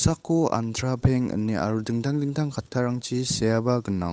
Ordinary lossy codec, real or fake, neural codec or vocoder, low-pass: none; fake; codec, 16 kHz, 8 kbps, FunCodec, trained on Chinese and English, 25 frames a second; none